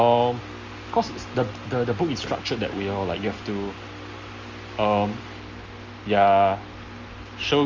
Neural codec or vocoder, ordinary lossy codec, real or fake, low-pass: none; Opus, 32 kbps; real; 7.2 kHz